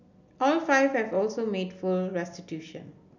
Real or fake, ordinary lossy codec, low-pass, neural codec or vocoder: real; none; 7.2 kHz; none